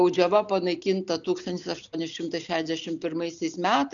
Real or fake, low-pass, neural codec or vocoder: real; 7.2 kHz; none